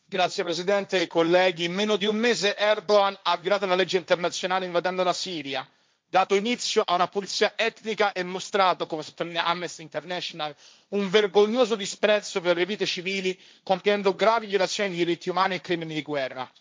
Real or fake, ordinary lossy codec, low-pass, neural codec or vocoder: fake; none; none; codec, 16 kHz, 1.1 kbps, Voila-Tokenizer